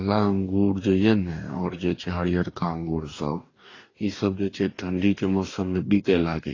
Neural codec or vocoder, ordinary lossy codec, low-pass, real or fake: codec, 44.1 kHz, 2.6 kbps, DAC; AAC, 32 kbps; 7.2 kHz; fake